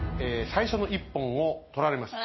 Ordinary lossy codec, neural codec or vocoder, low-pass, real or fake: MP3, 24 kbps; none; 7.2 kHz; real